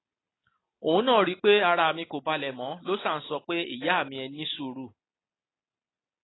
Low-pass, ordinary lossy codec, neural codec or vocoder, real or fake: 7.2 kHz; AAC, 16 kbps; none; real